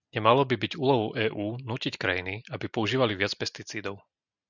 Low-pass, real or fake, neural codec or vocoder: 7.2 kHz; real; none